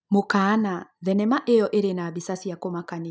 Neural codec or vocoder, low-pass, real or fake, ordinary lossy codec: none; none; real; none